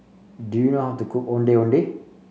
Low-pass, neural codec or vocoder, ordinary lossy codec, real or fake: none; none; none; real